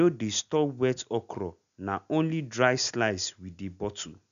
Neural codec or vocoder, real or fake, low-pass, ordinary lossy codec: none; real; 7.2 kHz; none